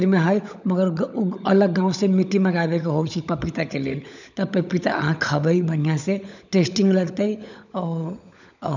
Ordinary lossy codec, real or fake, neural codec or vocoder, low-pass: none; fake; codec, 16 kHz, 16 kbps, FunCodec, trained on LibriTTS, 50 frames a second; 7.2 kHz